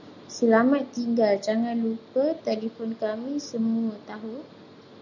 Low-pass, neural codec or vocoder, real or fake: 7.2 kHz; none; real